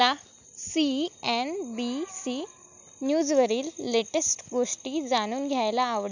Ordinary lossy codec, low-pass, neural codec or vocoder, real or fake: none; 7.2 kHz; none; real